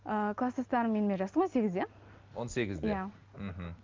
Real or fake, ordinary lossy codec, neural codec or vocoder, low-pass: real; Opus, 32 kbps; none; 7.2 kHz